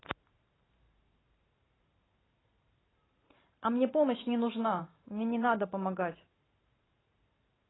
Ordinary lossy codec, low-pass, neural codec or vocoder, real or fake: AAC, 16 kbps; 7.2 kHz; vocoder, 44.1 kHz, 128 mel bands every 512 samples, BigVGAN v2; fake